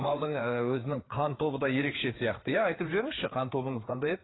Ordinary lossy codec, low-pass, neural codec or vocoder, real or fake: AAC, 16 kbps; 7.2 kHz; codec, 16 kHz, 4 kbps, FreqCodec, larger model; fake